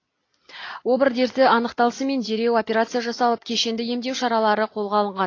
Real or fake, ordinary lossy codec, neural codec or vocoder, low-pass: real; AAC, 32 kbps; none; 7.2 kHz